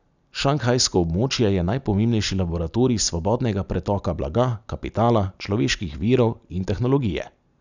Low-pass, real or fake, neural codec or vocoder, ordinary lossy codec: 7.2 kHz; real; none; none